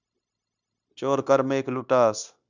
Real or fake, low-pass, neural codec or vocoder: fake; 7.2 kHz; codec, 16 kHz, 0.9 kbps, LongCat-Audio-Codec